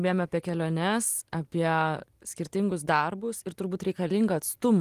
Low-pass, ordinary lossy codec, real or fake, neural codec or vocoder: 14.4 kHz; Opus, 24 kbps; real; none